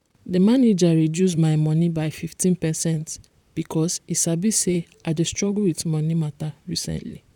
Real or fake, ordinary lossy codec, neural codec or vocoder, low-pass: fake; none; vocoder, 44.1 kHz, 128 mel bands, Pupu-Vocoder; 19.8 kHz